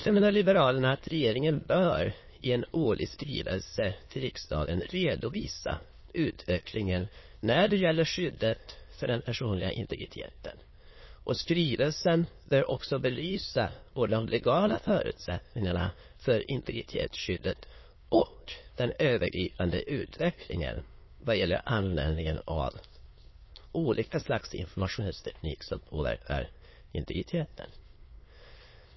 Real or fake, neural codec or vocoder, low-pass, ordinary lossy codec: fake; autoencoder, 22.05 kHz, a latent of 192 numbers a frame, VITS, trained on many speakers; 7.2 kHz; MP3, 24 kbps